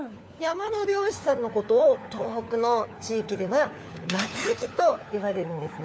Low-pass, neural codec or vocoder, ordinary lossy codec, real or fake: none; codec, 16 kHz, 4 kbps, FunCodec, trained on LibriTTS, 50 frames a second; none; fake